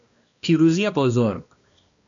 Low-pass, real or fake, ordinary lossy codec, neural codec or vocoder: 7.2 kHz; fake; MP3, 64 kbps; codec, 16 kHz, 1 kbps, X-Codec, HuBERT features, trained on balanced general audio